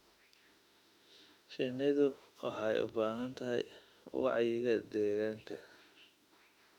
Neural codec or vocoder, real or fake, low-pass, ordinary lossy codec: autoencoder, 48 kHz, 32 numbers a frame, DAC-VAE, trained on Japanese speech; fake; 19.8 kHz; none